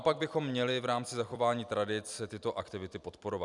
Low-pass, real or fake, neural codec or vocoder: 10.8 kHz; fake; vocoder, 44.1 kHz, 128 mel bands every 256 samples, BigVGAN v2